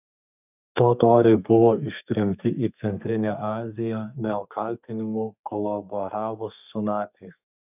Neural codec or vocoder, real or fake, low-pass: codec, 32 kHz, 1.9 kbps, SNAC; fake; 3.6 kHz